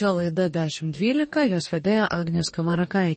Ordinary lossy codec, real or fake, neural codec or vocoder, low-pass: MP3, 32 kbps; fake; codec, 44.1 kHz, 2.6 kbps, DAC; 9.9 kHz